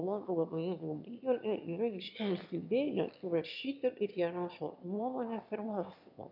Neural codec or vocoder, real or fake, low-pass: autoencoder, 22.05 kHz, a latent of 192 numbers a frame, VITS, trained on one speaker; fake; 5.4 kHz